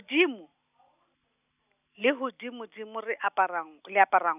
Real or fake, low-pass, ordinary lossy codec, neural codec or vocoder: real; 3.6 kHz; none; none